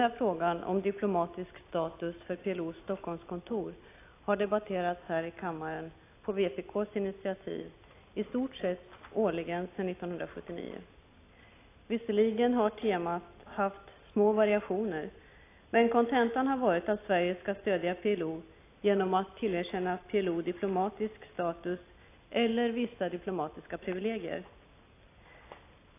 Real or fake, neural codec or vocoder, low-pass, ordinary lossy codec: real; none; 3.6 kHz; AAC, 24 kbps